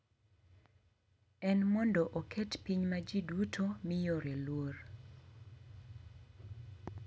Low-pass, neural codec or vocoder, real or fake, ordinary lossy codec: none; none; real; none